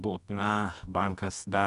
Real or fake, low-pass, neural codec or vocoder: fake; 10.8 kHz; codec, 24 kHz, 0.9 kbps, WavTokenizer, medium music audio release